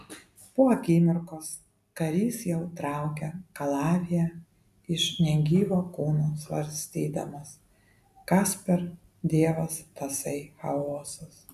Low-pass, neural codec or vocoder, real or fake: 14.4 kHz; none; real